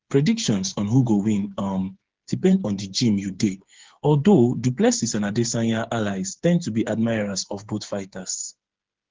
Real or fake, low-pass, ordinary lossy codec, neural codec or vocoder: fake; 7.2 kHz; Opus, 16 kbps; codec, 16 kHz, 8 kbps, FreqCodec, smaller model